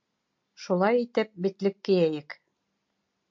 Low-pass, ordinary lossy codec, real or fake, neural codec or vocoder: 7.2 kHz; MP3, 48 kbps; real; none